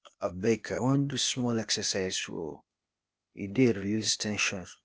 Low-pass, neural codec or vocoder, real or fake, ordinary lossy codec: none; codec, 16 kHz, 0.8 kbps, ZipCodec; fake; none